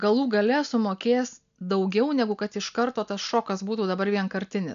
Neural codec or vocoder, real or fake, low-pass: none; real; 7.2 kHz